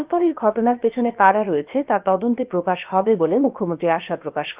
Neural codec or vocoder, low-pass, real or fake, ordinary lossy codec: codec, 16 kHz, about 1 kbps, DyCAST, with the encoder's durations; 3.6 kHz; fake; Opus, 24 kbps